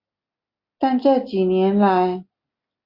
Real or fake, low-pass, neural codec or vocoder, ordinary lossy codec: real; 5.4 kHz; none; Opus, 64 kbps